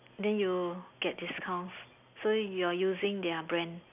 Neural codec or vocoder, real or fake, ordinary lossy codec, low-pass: none; real; none; 3.6 kHz